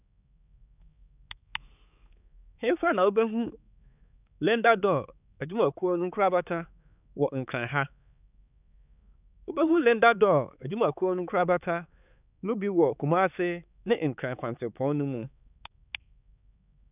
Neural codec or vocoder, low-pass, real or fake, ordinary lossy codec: codec, 16 kHz, 4 kbps, X-Codec, HuBERT features, trained on balanced general audio; 3.6 kHz; fake; none